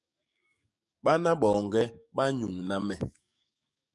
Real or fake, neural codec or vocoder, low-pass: fake; codec, 44.1 kHz, 7.8 kbps, DAC; 10.8 kHz